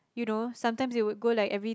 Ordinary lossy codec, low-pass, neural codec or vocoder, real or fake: none; none; none; real